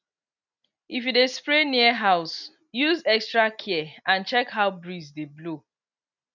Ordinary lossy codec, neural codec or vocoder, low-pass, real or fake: none; none; 7.2 kHz; real